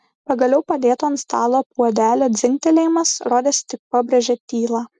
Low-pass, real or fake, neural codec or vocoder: 10.8 kHz; real; none